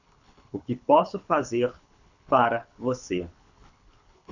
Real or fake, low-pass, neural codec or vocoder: fake; 7.2 kHz; codec, 24 kHz, 6 kbps, HILCodec